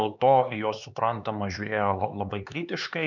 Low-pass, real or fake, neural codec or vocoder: 7.2 kHz; fake; codec, 16 kHz, 4 kbps, X-Codec, HuBERT features, trained on LibriSpeech